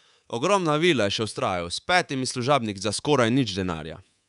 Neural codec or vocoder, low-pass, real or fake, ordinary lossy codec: codec, 24 kHz, 3.1 kbps, DualCodec; 10.8 kHz; fake; none